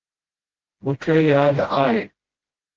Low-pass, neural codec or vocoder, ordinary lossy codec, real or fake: 7.2 kHz; codec, 16 kHz, 0.5 kbps, FreqCodec, smaller model; Opus, 16 kbps; fake